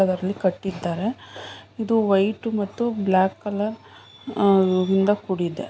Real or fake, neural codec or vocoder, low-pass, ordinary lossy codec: real; none; none; none